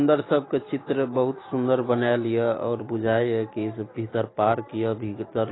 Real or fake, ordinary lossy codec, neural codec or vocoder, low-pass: real; AAC, 16 kbps; none; 7.2 kHz